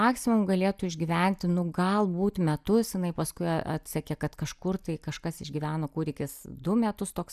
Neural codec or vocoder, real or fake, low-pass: none; real; 14.4 kHz